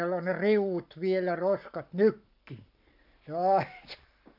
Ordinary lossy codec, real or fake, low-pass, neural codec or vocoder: none; fake; 5.4 kHz; codec, 44.1 kHz, 7.8 kbps, Pupu-Codec